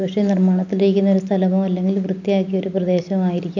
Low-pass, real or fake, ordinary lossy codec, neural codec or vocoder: 7.2 kHz; real; none; none